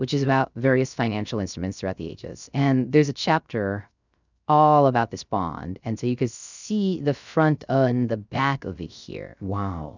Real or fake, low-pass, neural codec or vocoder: fake; 7.2 kHz; codec, 16 kHz, 0.3 kbps, FocalCodec